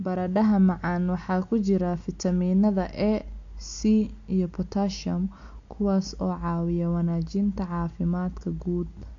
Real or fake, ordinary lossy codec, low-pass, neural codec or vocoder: real; AAC, 48 kbps; 7.2 kHz; none